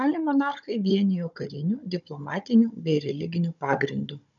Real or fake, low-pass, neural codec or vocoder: fake; 7.2 kHz; codec, 16 kHz, 16 kbps, FunCodec, trained on Chinese and English, 50 frames a second